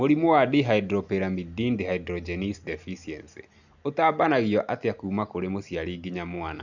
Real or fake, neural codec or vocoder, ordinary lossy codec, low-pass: real; none; none; 7.2 kHz